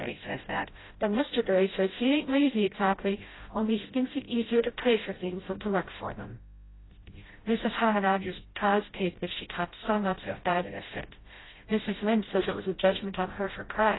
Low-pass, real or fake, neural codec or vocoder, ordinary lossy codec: 7.2 kHz; fake; codec, 16 kHz, 0.5 kbps, FreqCodec, smaller model; AAC, 16 kbps